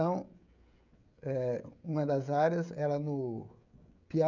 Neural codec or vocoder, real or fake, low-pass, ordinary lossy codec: codec, 16 kHz, 16 kbps, FreqCodec, smaller model; fake; 7.2 kHz; none